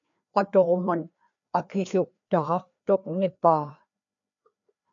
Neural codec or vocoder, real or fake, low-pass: codec, 16 kHz, 2 kbps, FreqCodec, larger model; fake; 7.2 kHz